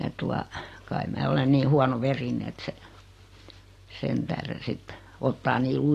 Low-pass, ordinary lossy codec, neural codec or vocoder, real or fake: 14.4 kHz; AAC, 48 kbps; none; real